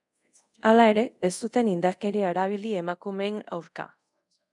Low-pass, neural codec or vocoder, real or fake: 10.8 kHz; codec, 24 kHz, 0.5 kbps, DualCodec; fake